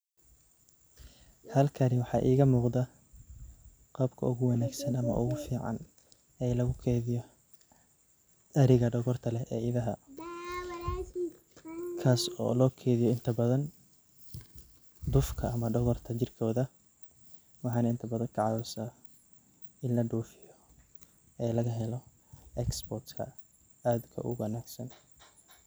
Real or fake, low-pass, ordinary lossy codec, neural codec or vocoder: real; none; none; none